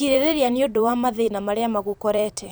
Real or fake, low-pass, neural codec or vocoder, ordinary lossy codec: fake; none; vocoder, 44.1 kHz, 128 mel bands every 512 samples, BigVGAN v2; none